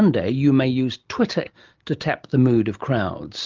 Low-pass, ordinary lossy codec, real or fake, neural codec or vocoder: 7.2 kHz; Opus, 24 kbps; real; none